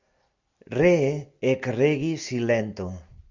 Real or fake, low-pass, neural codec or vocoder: real; 7.2 kHz; none